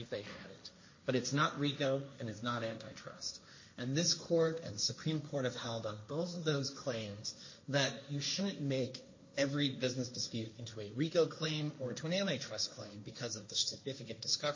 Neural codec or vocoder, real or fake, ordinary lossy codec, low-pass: codec, 16 kHz, 1.1 kbps, Voila-Tokenizer; fake; MP3, 32 kbps; 7.2 kHz